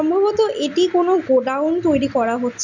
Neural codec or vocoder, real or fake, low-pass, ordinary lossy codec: none; real; 7.2 kHz; none